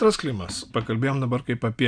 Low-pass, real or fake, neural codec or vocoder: 9.9 kHz; real; none